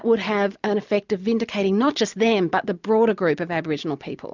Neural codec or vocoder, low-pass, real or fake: none; 7.2 kHz; real